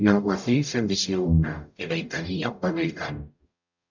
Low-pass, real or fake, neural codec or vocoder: 7.2 kHz; fake; codec, 44.1 kHz, 0.9 kbps, DAC